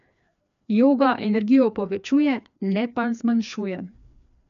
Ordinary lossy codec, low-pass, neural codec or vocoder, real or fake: MP3, 64 kbps; 7.2 kHz; codec, 16 kHz, 2 kbps, FreqCodec, larger model; fake